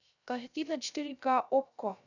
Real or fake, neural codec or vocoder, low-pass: fake; codec, 16 kHz, 0.3 kbps, FocalCodec; 7.2 kHz